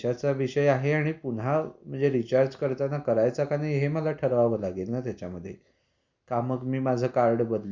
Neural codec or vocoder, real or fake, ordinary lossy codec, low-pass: none; real; Opus, 64 kbps; 7.2 kHz